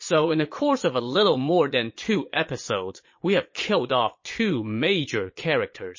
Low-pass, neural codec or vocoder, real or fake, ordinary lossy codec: 7.2 kHz; vocoder, 22.05 kHz, 80 mel bands, Vocos; fake; MP3, 32 kbps